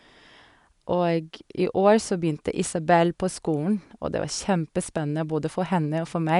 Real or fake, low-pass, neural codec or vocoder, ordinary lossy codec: real; 10.8 kHz; none; none